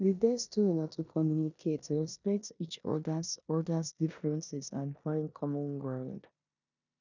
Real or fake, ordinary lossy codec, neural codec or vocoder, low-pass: fake; none; codec, 16 kHz in and 24 kHz out, 0.9 kbps, LongCat-Audio-Codec, four codebook decoder; 7.2 kHz